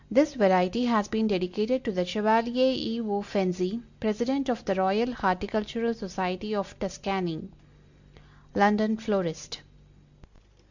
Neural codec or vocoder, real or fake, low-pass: none; real; 7.2 kHz